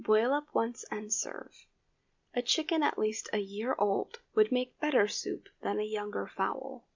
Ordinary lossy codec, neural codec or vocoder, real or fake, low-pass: MP3, 64 kbps; none; real; 7.2 kHz